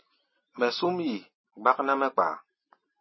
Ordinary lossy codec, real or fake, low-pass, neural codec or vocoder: MP3, 24 kbps; real; 7.2 kHz; none